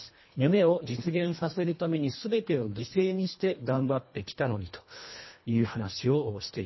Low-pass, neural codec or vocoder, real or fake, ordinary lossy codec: 7.2 kHz; codec, 24 kHz, 1.5 kbps, HILCodec; fake; MP3, 24 kbps